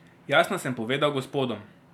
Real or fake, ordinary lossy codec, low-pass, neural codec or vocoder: real; none; 19.8 kHz; none